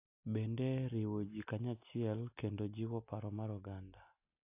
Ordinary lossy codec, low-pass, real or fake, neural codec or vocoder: AAC, 24 kbps; 3.6 kHz; real; none